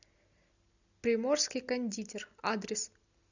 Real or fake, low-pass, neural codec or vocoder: real; 7.2 kHz; none